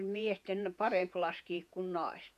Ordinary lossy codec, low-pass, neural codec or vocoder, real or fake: none; 14.4 kHz; vocoder, 48 kHz, 128 mel bands, Vocos; fake